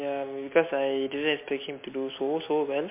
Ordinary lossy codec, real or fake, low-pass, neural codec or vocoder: MP3, 24 kbps; real; 3.6 kHz; none